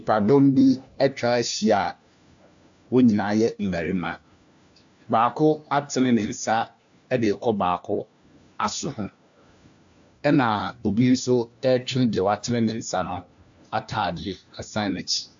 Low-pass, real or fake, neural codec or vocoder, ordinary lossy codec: 7.2 kHz; fake; codec, 16 kHz, 1 kbps, FunCodec, trained on LibriTTS, 50 frames a second; AAC, 64 kbps